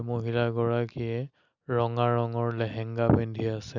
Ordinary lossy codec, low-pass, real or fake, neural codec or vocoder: none; 7.2 kHz; real; none